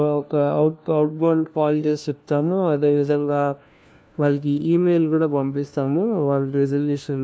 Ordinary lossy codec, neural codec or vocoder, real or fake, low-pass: none; codec, 16 kHz, 1 kbps, FunCodec, trained on LibriTTS, 50 frames a second; fake; none